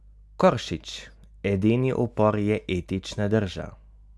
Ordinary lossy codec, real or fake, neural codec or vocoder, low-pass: none; real; none; none